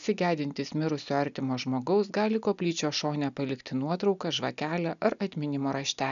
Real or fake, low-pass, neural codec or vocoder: real; 7.2 kHz; none